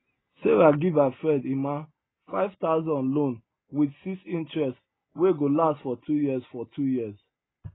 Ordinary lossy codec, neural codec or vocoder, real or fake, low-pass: AAC, 16 kbps; none; real; 7.2 kHz